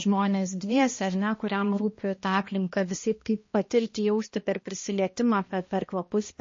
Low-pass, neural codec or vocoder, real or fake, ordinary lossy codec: 7.2 kHz; codec, 16 kHz, 1 kbps, X-Codec, HuBERT features, trained on balanced general audio; fake; MP3, 32 kbps